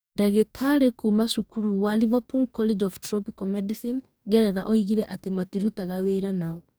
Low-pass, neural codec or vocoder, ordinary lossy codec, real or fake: none; codec, 44.1 kHz, 2.6 kbps, DAC; none; fake